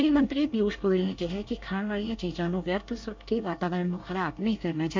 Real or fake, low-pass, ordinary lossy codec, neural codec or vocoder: fake; 7.2 kHz; MP3, 64 kbps; codec, 24 kHz, 1 kbps, SNAC